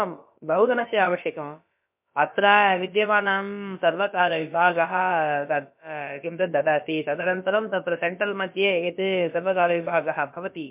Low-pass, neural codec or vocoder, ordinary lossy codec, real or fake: 3.6 kHz; codec, 16 kHz, about 1 kbps, DyCAST, with the encoder's durations; MP3, 32 kbps; fake